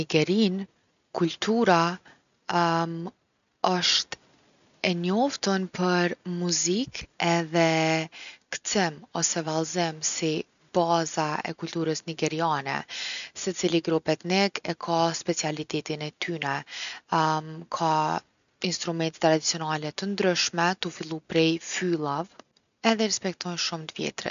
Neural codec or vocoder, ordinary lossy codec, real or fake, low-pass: none; none; real; 7.2 kHz